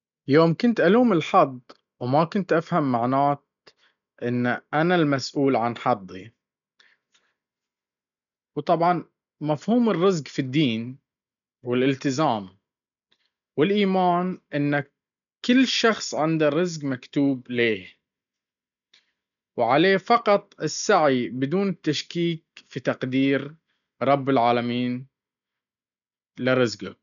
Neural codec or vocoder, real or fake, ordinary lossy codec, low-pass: none; real; none; 7.2 kHz